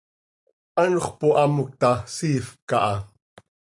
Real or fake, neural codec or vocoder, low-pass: real; none; 10.8 kHz